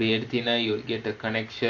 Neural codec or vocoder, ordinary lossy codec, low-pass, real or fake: none; AAC, 48 kbps; 7.2 kHz; real